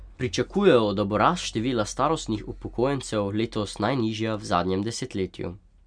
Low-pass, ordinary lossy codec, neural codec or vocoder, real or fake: 9.9 kHz; none; none; real